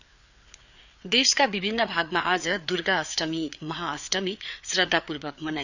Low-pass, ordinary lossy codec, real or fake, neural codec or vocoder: 7.2 kHz; none; fake; codec, 16 kHz, 4 kbps, FreqCodec, larger model